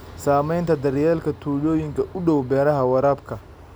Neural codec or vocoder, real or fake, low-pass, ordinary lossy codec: none; real; none; none